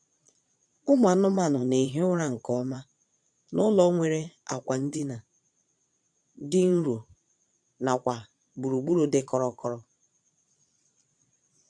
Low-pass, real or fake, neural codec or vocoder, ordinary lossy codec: 9.9 kHz; fake; vocoder, 22.05 kHz, 80 mel bands, Vocos; none